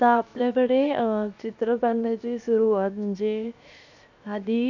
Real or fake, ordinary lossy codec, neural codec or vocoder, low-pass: fake; none; codec, 16 kHz, 0.7 kbps, FocalCodec; 7.2 kHz